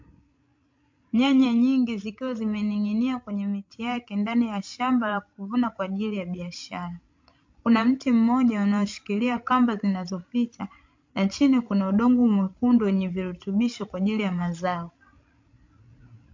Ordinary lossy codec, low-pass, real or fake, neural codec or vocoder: MP3, 48 kbps; 7.2 kHz; fake; codec, 16 kHz, 16 kbps, FreqCodec, larger model